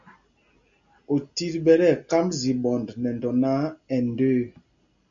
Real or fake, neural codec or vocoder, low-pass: real; none; 7.2 kHz